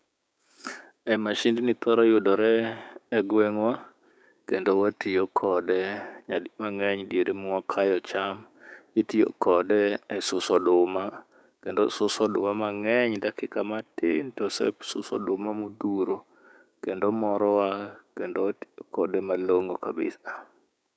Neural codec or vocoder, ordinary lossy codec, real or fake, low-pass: codec, 16 kHz, 6 kbps, DAC; none; fake; none